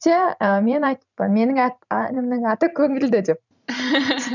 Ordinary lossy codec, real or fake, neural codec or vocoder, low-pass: none; fake; vocoder, 44.1 kHz, 128 mel bands every 512 samples, BigVGAN v2; 7.2 kHz